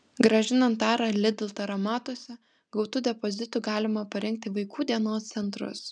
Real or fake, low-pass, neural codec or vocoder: real; 9.9 kHz; none